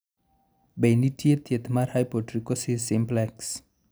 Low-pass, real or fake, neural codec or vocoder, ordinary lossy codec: none; real; none; none